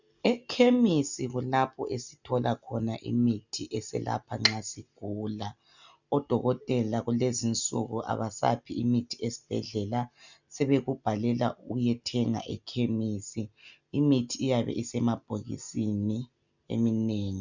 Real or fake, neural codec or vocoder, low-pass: real; none; 7.2 kHz